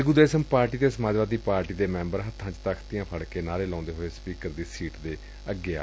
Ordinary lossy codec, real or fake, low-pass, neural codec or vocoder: none; real; none; none